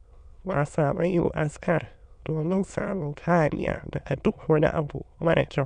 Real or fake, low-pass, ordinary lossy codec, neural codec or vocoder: fake; 9.9 kHz; none; autoencoder, 22.05 kHz, a latent of 192 numbers a frame, VITS, trained on many speakers